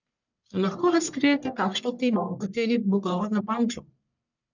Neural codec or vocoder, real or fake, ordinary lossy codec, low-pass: codec, 44.1 kHz, 1.7 kbps, Pupu-Codec; fake; none; 7.2 kHz